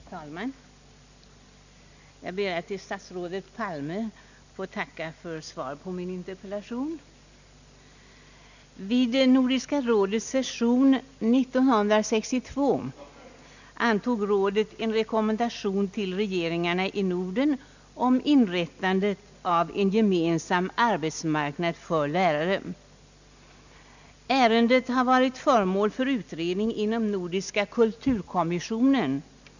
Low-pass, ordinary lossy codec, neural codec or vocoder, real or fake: 7.2 kHz; none; none; real